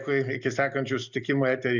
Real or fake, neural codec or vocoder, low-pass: real; none; 7.2 kHz